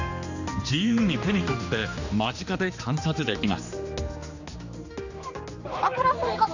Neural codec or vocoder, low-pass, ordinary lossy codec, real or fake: codec, 16 kHz, 2 kbps, X-Codec, HuBERT features, trained on general audio; 7.2 kHz; none; fake